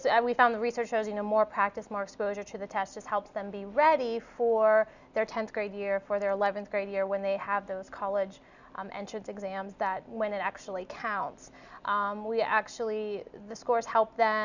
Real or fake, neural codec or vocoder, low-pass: real; none; 7.2 kHz